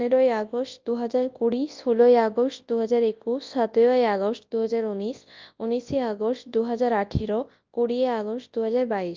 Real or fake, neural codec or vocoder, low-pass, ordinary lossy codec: fake; codec, 24 kHz, 0.9 kbps, WavTokenizer, large speech release; 7.2 kHz; Opus, 24 kbps